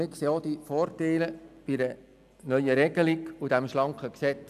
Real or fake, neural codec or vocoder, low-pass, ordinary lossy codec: real; none; 14.4 kHz; none